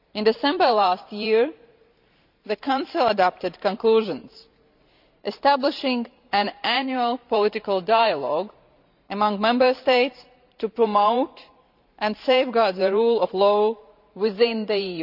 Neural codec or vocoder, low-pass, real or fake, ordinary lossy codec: vocoder, 44.1 kHz, 128 mel bands every 512 samples, BigVGAN v2; 5.4 kHz; fake; none